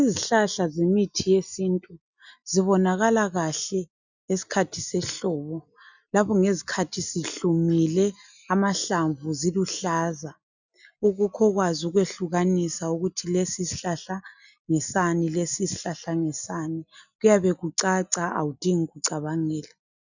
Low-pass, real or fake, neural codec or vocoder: 7.2 kHz; real; none